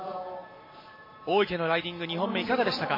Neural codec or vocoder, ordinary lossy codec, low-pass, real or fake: none; MP3, 24 kbps; 5.4 kHz; real